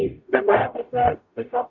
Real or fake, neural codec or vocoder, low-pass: fake; codec, 44.1 kHz, 0.9 kbps, DAC; 7.2 kHz